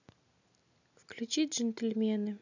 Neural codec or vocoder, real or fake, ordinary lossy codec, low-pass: none; real; none; 7.2 kHz